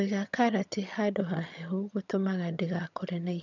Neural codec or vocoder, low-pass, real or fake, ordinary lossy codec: vocoder, 22.05 kHz, 80 mel bands, HiFi-GAN; 7.2 kHz; fake; none